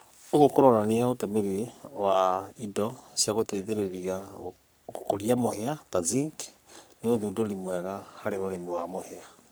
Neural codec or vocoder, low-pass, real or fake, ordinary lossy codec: codec, 44.1 kHz, 3.4 kbps, Pupu-Codec; none; fake; none